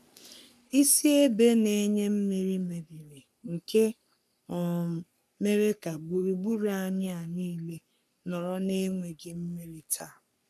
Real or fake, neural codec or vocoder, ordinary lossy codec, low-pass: fake; codec, 44.1 kHz, 3.4 kbps, Pupu-Codec; none; 14.4 kHz